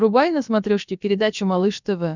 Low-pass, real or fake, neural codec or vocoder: 7.2 kHz; fake; codec, 16 kHz, about 1 kbps, DyCAST, with the encoder's durations